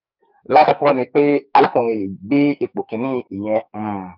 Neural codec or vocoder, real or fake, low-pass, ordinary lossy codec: codec, 44.1 kHz, 2.6 kbps, SNAC; fake; 5.4 kHz; none